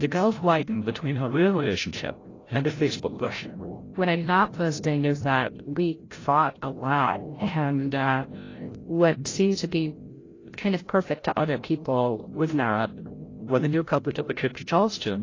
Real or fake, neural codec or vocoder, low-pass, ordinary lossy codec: fake; codec, 16 kHz, 0.5 kbps, FreqCodec, larger model; 7.2 kHz; AAC, 32 kbps